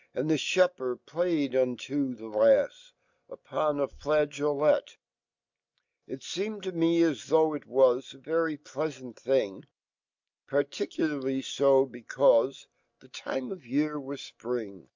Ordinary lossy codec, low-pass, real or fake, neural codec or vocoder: MP3, 64 kbps; 7.2 kHz; real; none